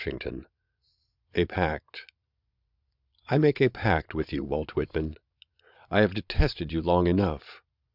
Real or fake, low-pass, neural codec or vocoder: fake; 5.4 kHz; vocoder, 22.05 kHz, 80 mel bands, Vocos